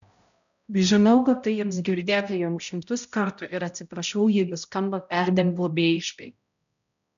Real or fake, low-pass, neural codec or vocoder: fake; 7.2 kHz; codec, 16 kHz, 0.5 kbps, X-Codec, HuBERT features, trained on balanced general audio